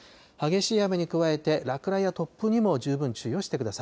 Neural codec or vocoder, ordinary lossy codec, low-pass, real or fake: none; none; none; real